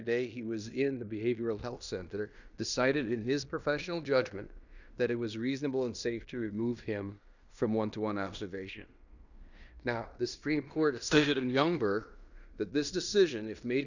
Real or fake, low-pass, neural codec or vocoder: fake; 7.2 kHz; codec, 16 kHz in and 24 kHz out, 0.9 kbps, LongCat-Audio-Codec, fine tuned four codebook decoder